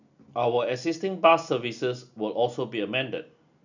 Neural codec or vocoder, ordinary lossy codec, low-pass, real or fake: none; none; 7.2 kHz; real